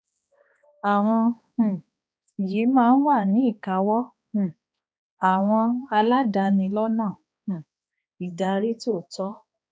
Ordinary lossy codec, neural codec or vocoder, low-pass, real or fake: none; codec, 16 kHz, 2 kbps, X-Codec, HuBERT features, trained on balanced general audio; none; fake